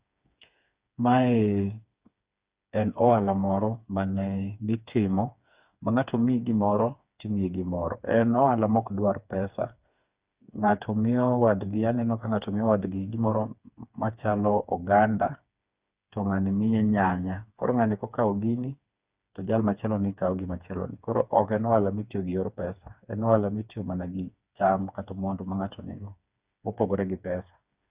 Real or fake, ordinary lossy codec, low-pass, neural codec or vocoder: fake; Opus, 64 kbps; 3.6 kHz; codec, 16 kHz, 4 kbps, FreqCodec, smaller model